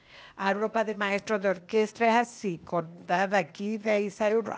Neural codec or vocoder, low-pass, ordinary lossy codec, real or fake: codec, 16 kHz, 0.8 kbps, ZipCodec; none; none; fake